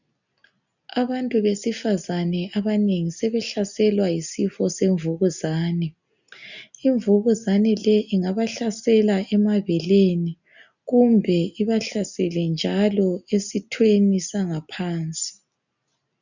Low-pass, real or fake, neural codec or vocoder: 7.2 kHz; real; none